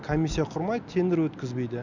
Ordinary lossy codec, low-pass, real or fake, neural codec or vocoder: none; 7.2 kHz; real; none